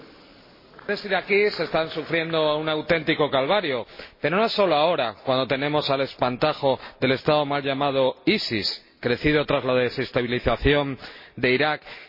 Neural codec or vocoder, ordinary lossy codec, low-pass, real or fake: none; MP3, 24 kbps; 5.4 kHz; real